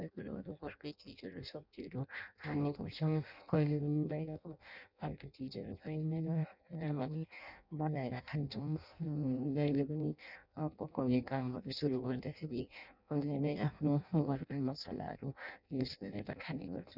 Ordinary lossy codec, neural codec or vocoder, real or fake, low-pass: none; codec, 16 kHz in and 24 kHz out, 0.6 kbps, FireRedTTS-2 codec; fake; 5.4 kHz